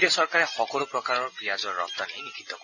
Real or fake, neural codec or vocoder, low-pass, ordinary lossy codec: real; none; 7.2 kHz; none